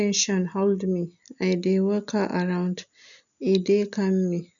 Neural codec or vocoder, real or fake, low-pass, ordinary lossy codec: none; real; 7.2 kHz; none